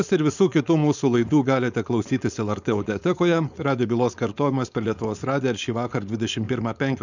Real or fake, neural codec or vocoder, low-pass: fake; codec, 16 kHz, 4.8 kbps, FACodec; 7.2 kHz